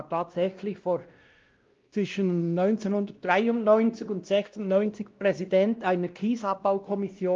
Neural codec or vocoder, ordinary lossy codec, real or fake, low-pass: codec, 16 kHz, 1 kbps, X-Codec, WavLM features, trained on Multilingual LibriSpeech; Opus, 32 kbps; fake; 7.2 kHz